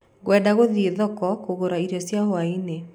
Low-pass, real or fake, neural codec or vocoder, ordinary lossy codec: 19.8 kHz; real; none; MP3, 96 kbps